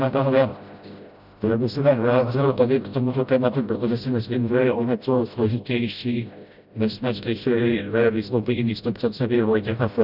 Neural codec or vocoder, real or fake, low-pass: codec, 16 kHz, 0.5 kbps, FreqCodec, smaller model; fake; 5.4 kHz